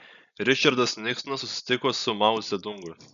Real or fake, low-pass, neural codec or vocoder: real; 7.2 kHz; none